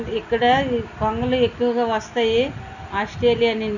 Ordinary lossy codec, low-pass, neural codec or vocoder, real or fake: none; 7.2 kHz; none; real